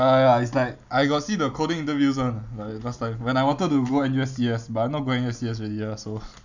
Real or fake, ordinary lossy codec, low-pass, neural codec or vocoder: real; none; 7.2 kHz; none